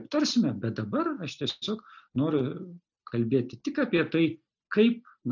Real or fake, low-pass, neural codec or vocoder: real; 7.2 kHz; none